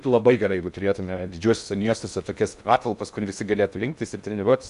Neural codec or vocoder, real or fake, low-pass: codec, 16 kHz in and 24 kHz out, 0.6 kbps, FocalCodec, streaming, 2048 codes; fake; 10.8 kHz